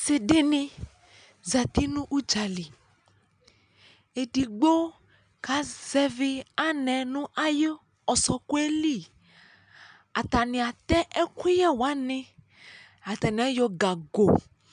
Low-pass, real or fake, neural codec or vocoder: 9.9 kHz; real; none